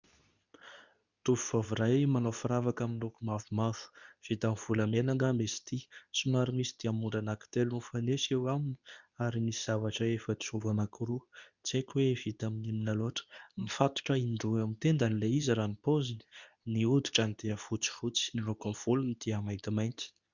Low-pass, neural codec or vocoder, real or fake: 7.2 kHz; codec, 24 kHz, 0.9 kbps, WavTokenizer, medium speech release version 2; fake